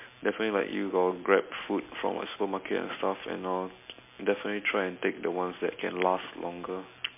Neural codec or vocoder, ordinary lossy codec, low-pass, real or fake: none; MP3, 24 kbps; 3.6 kHz; real